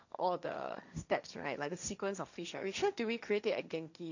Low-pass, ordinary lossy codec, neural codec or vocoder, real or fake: 7.2 kHz; none; codec, 16 kHz, 1.1 kbps, Voila-Tokenizer; fake